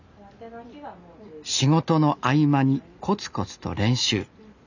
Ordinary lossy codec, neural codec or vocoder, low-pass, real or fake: none; none; 7.2 kHz; real